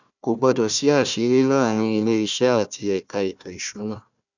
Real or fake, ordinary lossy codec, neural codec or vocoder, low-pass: fake; none; codec, 16 kHz, 1 kbps, FunCodec, trained on Chinese and English, 50 frames a second; 7.2 kHz